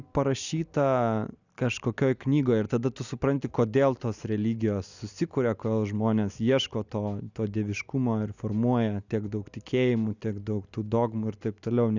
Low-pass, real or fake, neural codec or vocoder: 7.2 kHz; real; none